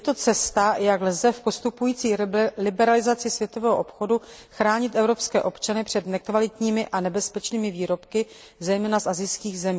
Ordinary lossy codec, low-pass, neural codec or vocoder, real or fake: none; none; none; real